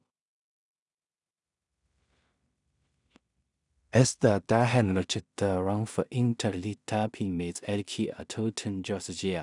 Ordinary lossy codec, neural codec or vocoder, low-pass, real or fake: none; codec, 16 kHz in and 24 kHz out, 0.4 kbps, LongCat-Audio-Codec, two codebook decoder; 10.8 kHz; fake